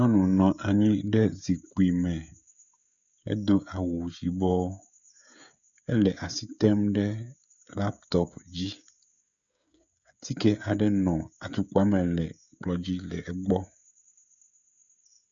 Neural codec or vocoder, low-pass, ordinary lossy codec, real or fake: codec, 16 kHz, 16 kbps, FreqCodec, smaller model; 7.2 kHz; MP3, 96 kbps; fake